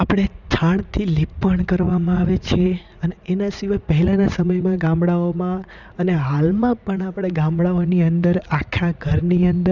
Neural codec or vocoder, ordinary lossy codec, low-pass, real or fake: vocoder, 44.1 kHz, 80 mel bands, Vocos; none; 7.2 kHz; fake